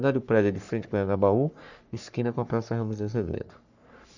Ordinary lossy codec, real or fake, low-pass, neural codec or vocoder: none; fake; 7.2 kHz; codec, 44.1 kHz, 3.4 kbps, Pupu-Codec